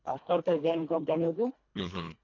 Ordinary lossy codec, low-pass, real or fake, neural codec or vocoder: MP3, 64 kbps; 7.2 kHz; fake; codec, 24 kHz, 1.5 kbps, HILCodec